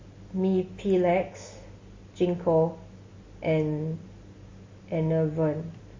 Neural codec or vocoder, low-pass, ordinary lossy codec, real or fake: none; 7.2 kHz; MP3, 32 kbps; real